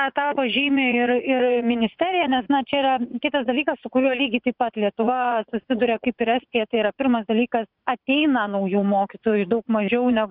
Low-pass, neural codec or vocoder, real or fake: 5.4 kHz; vocoder, 44.1 kHz, 80 mel bands, Vocos; fake